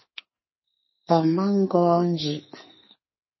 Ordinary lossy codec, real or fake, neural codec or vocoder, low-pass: MP3, 24 kbps; fake; codec, 44.1 kHz, 2.6 kbps, SNAC; 7.2 kHz